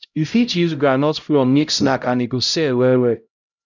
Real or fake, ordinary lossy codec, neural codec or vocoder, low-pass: fake; none; codec, 16 kHz, 0.5 kbps, X-Codec, HuBERT features, trained on LibriSpeech; 7.2 kHz